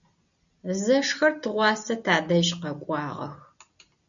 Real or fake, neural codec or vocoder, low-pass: real; none; 7.2 kHz